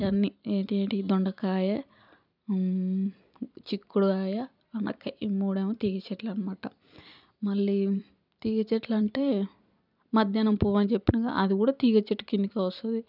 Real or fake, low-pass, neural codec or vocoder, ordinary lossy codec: fake; 5.4 kHz; vocoder, 44.1 kHz, 128 mel bands every 256 samples, BigVGAN v2; none